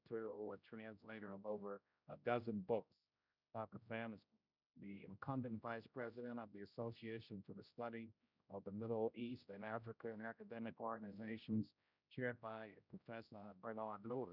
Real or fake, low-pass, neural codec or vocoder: fake; 5.4 kHz; codec, 16 kHz, 0.5 kbps, X-Codec, HuBERT features, trained on general audio